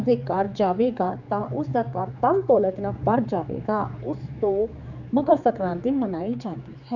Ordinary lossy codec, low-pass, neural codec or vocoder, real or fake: none; 7.2 kHz; codec, 16 kHz, 4 kbps, X-Codec, HuBERT features, trained on general audio; fake